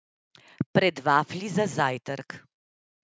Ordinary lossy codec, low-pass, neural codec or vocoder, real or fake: none; none; none; real